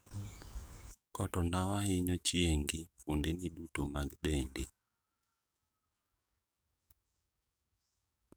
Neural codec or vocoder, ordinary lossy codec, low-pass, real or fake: codec, 44.1 kHz, 7.8 kbps, DAC; none; none; fake